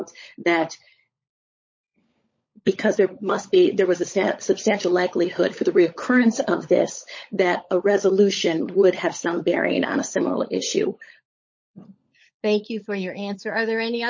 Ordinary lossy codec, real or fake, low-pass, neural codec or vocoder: MP3, 32 kbps; fake; 7.2 kHz; codec, 16 kHz, 16 kbps, FunCodec, trained on LibriTTS, 50 frames a second